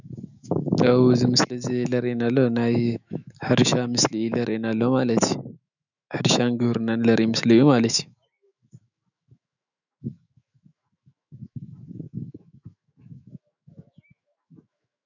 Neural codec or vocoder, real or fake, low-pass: autoencoder, 48 kHz, 128 numbers a frame, DAC-VAE, trained on Japanese speech; fake; 7.2 kHz